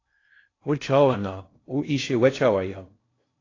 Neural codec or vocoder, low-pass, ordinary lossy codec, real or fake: codec, 16 kHz in and 24 kHz out, 0.6 kbps, FocalCodec, streaming, 2048 codes; 7.2 kHz; AAC, 32 kbps; fake